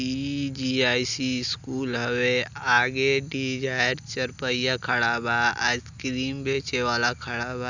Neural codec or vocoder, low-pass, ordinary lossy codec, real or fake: none; 7.2 kHz; none; real